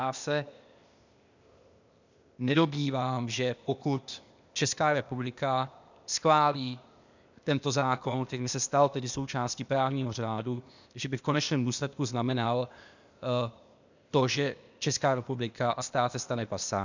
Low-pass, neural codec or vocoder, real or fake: 7.2 kHz; codec, 16 kHz, 0.8 kbps, ZipCodec; fake